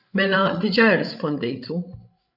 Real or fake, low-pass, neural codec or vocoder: fake; 5.4 kHz; codec, 16 kHz, 16 kbps, FreqCodec, larger model